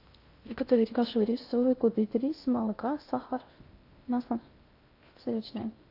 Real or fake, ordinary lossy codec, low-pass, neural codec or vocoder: fake; AAC, 32 kbps; 5.4 kHz; codec, 16 kHz in and 24 kHz out, 0.6 kbps, FocalCodec, streaming, 2048 codes